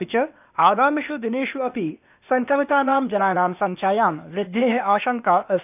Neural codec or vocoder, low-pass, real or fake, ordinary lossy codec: codec, 16 kHz, 0.8 kbps, ZipCodec; 3.6 kHz; fake; none